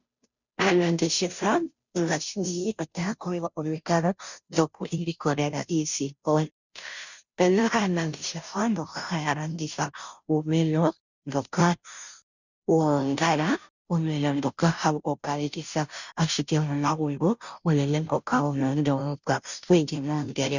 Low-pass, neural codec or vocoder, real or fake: 7.2 kHz; codec, 16 kHz, 0.5 kbps, FunCodec, trained on Chinese and English, 25 frames a second; fake